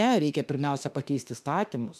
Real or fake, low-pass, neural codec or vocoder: fake; 14.4 kHz; autoencoder, 48 kHz, 32 numbers a frame, DAC-VAE, trained on Japanese speech